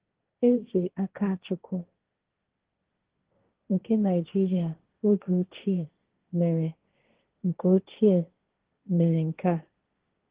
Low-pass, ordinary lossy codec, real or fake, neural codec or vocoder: 3.6 kHz; Opus, 16 kbps; fake; codec, 16 kHz, 1.1 kbps, Voila-Tokenizer